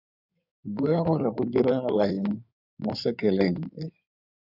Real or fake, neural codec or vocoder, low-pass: fake; codec, 16 kHz in and 24 kHz out, 2.2 kbps, FireRedTTS-2 codec; 5.4 kHz